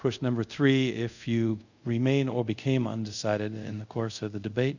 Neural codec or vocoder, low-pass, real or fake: codec, 24 kHz, 0.5 kbps, DualCodec; 7.2 kHz; fake